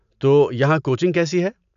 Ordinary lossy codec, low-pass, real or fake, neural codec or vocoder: none; 7.2 kHz; real; none